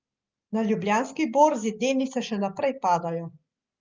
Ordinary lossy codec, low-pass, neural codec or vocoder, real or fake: Opus, 24 kbps; 7.2 kHz; none; real